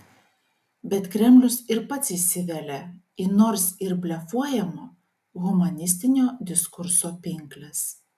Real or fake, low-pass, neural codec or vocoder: real; 14.4 kHz; none